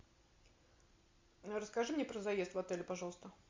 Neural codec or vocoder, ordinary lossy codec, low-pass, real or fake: none; MP3, 48 kbps; 7.2 kHz; real